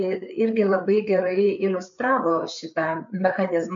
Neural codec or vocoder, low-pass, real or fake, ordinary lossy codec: codec, 16 kHz, 4 kbps, FreqCodec, larger model; 7.2 kHz; fake; MP3, 64 kbps